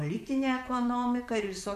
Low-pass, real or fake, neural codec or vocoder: 14.4 kHz; fake; vocoder, 44.1 kHz, 128 mel bands, Pupu-Vocoder